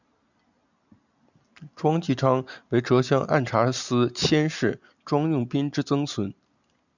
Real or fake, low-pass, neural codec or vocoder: real; 7.2 kHz; none